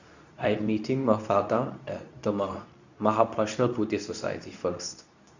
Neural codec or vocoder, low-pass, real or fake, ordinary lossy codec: codec, 24 kHz, 0.9 kbps, WavTokenizer, medium speech release version 2; 7.2 kHz; fake; none